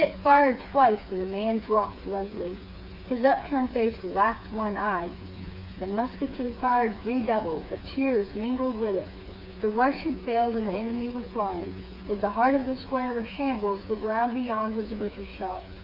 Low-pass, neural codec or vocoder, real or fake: 5.4 kHz; codec, 16 kHz, 4 kbps, FreqCodec, smaller model; fake